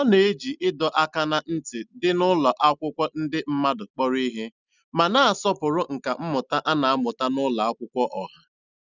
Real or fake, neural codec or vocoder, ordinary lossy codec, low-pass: real; none; none; 7.2 kHz